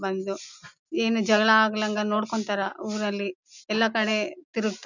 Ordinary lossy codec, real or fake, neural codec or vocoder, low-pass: none; real; none; 7.2 kHz